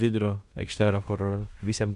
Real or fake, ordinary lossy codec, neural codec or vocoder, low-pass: fake; Opus, 64 kbps; codec, 16 kHz in and 24 kHz out, 0.9 kbps, LongCat-Audio-Codec, fine tuned four codebook decoder; 10.8 kHz